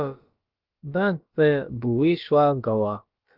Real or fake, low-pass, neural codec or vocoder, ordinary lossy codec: fake; 5.4 kHz; codec, 16 kHz, about 1 kbps, DyCAST, with the encoder's durations; Opus, 32 kbps